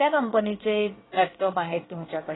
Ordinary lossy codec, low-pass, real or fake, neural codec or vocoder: AAC, 16 kbps; 7.2 kHz; fake; codec, 24 kHz, 1 kbps, SNAC